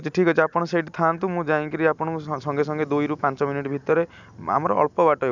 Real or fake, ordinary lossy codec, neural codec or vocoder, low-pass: real; none; none; 7.2 kHz